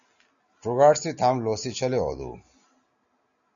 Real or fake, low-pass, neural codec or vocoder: real; 7.2 kHz; none